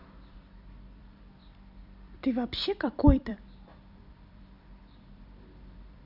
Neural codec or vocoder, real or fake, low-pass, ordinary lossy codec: vocoder, 44.1 kHz, 128 mel bands every 256 samples, BigVGAN v2; fake; 5.4 kHz; none